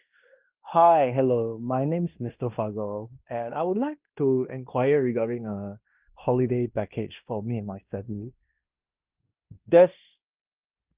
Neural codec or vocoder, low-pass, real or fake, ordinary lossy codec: codec, 16 kHz in and 24 kHz out, 0.9 kbps, LongCat-Audio-Codec, fine tuned four codebook decoder; 3.6 kHz; fake; Opus, 24 kbps